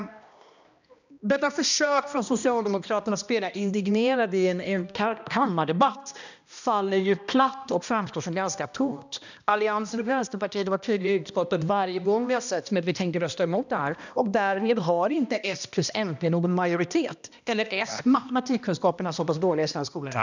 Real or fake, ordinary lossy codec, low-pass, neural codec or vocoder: fake; none; 7.2 kHz; codec, 16 kHz, 1 kbps, X-Codec, HuBERT features, trained on balanced general audio